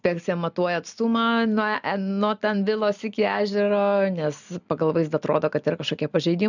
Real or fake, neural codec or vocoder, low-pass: real; none; 7.2 kHz